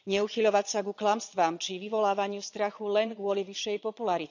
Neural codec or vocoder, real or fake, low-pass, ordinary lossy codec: vocoder, 22.05 kHz, 80 mel bands, Vocos; fake; 7.2 kHz; none